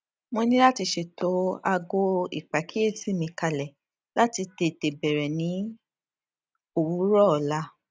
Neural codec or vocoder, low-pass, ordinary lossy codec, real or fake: none; none; none; real